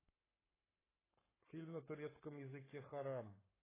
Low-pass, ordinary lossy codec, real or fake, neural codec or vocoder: 3.6 kHz; AAC, 16 kbps; fake; codec, 44.1 kHz, 7.8 kbps, Pupu-Codec